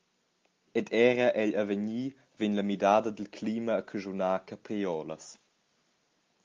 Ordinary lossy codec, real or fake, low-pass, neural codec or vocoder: Opus, 32 kbps; real; 7.2 kHz; none